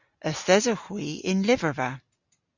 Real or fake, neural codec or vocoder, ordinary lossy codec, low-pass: real; none; Opus, 64 kbps; 7.2 kHz